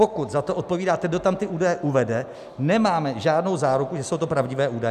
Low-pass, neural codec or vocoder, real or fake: 14.4 kHz; none; real